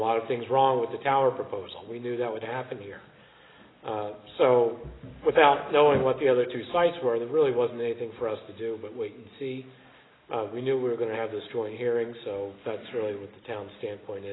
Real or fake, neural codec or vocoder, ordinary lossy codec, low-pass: real; none; AAC, 16 kbps; 7.2 kHz